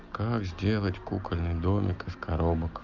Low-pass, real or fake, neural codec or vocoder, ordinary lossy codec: 7.2 kHz; real; none; Opus, 32 kbps